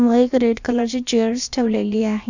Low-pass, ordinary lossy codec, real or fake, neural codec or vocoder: 7.2 kHz; none; fake; codec, 16 kHz, about 1 kbps, DyCAST, with the encoder's durations